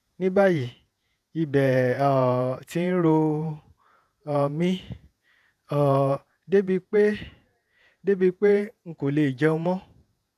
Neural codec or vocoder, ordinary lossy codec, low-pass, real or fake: vocoder, 48 kHz, 128 mel bands, Vocos; none; 14.4 kHz; fake